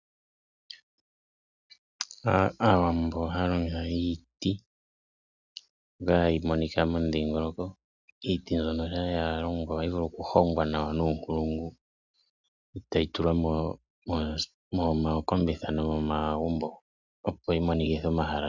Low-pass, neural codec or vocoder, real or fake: 7.2 kHz; none; real